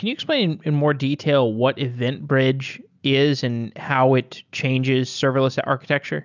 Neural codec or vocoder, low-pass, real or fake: none; 7.2 kHz; real